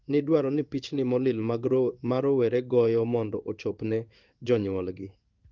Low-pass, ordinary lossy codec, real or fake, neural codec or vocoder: 7.2 kHz; Opus, 24 kbps; fake; codec, 16 kHz in and 24 kHz out, 1 kbps, XY-Tokenizer